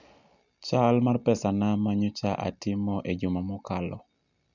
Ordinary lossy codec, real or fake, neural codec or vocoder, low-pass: none; real; none; 7.2 kHz